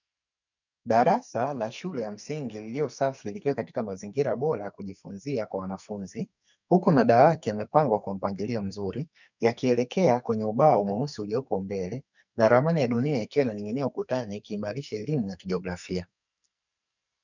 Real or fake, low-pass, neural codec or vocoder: fake; 7.2 kHz; codec, 44.1 kHz, 2.6 kbps, SNAC